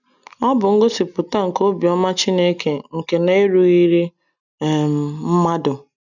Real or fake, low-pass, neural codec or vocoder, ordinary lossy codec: real; 7.2 kHz; none; none